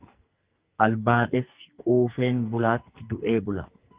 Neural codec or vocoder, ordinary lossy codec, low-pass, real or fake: codec, 44.1 kHz, 2.6 kbps, SNAC; Opus, 32 kbps; 3.6 kHz; fake